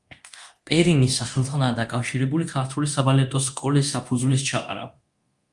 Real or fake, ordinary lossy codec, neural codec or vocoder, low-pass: fake; Opus, 32 kbps; codec, 24 kHz, 0.9 kbps, DualCodec; 10.8 kHz